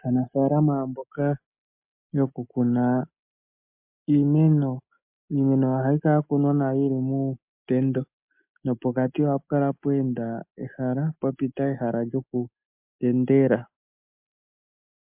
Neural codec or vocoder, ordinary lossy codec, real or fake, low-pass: none; MP3, 32 kbps; real; 3.6 kHz